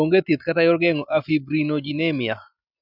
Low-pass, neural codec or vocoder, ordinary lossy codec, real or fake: 5.4 kHz; none; none; real